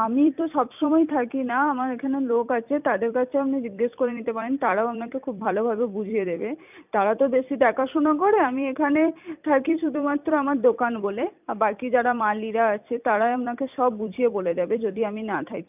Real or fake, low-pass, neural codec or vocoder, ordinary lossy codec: fake; 3.6 kHz; vocoder, 44.1 kHz, 128 mel bands every 256 samples, BigVGAN v2; none